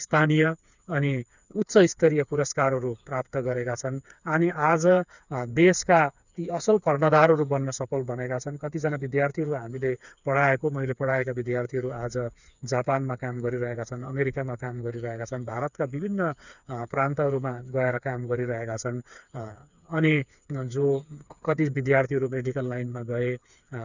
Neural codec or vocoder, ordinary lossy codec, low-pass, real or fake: codec, 16 kHz, 4 kbps, FreqCodec, smaller model; none; 7.2 kHz; fake